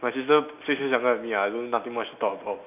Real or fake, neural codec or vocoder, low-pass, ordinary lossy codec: real; none; 3.6 kHz; none